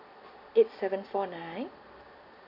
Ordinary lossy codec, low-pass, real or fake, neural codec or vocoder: none; 5.4 kHz; real; none